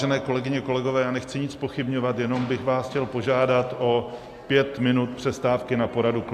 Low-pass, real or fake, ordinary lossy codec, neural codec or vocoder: 14.4 kHz; real; AAC, 96 kbps; none